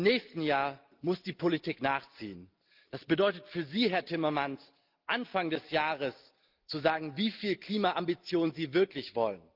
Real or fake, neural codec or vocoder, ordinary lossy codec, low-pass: real; none; Opus, 24 kbps; 5.4 kHz